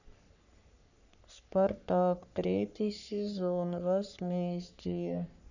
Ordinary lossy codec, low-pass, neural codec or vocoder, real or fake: none; 7.2 kHz; codec, 44.1 kHz, 3.4 kbps, Pupu-Codec; fake